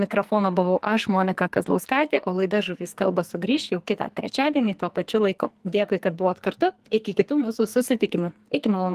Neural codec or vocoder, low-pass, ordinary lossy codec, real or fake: codec, 44.1 kHz, 2.6 kbps, SNAC; 14.4 kHz; Opus, 24 kbps; fake